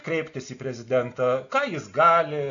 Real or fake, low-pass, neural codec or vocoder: real; 7.2 kHz; none